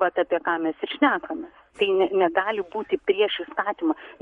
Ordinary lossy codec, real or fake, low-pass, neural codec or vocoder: MP3, 48 kbps; fake; 19.8 kHz; codec, 44.1 kHz, 7.8 kbps, Pupu-Codec